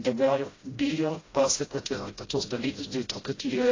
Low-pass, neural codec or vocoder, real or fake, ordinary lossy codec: 7.2 kHz; codec, 16 kHz, 0.5 kbps, FreqCodec, smaller model; fake; AAC, 32 kbps